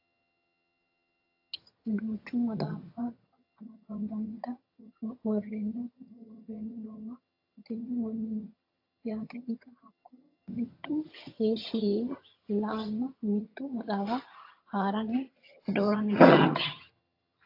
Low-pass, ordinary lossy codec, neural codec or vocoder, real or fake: 5.4 kHz; AAC, 32 kbps; vocoder, 22.05 kHz, 80 mel bands, HiFi-GAN; fake